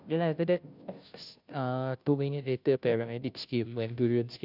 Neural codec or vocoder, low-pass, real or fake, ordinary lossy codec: codec, 16 kHz, 0.5 kbps, FunCodec, trained on Chinese and English, 25 frames a second; 5.4 kHz; fake; none